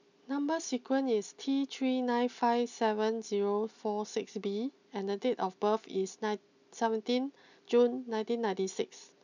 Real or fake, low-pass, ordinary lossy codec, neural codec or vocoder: real; 7.2 kHz; none; none